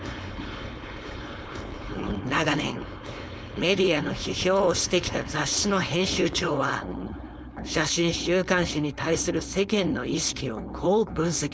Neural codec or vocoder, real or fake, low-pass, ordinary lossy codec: codec, 16 kHz, 4.8 kbps, FACodec; fake; none; none